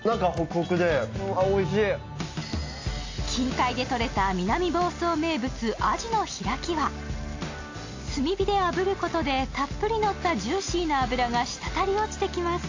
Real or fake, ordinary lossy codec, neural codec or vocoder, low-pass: real; AAC, 48 kbps; none; 7.2 kHz